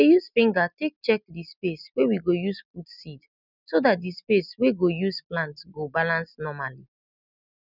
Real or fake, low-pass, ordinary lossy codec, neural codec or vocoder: real; 5.4 kHz; none; none